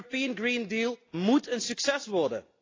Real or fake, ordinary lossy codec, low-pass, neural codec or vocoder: real; AAC, 32 kbps; 7.2 kHz; none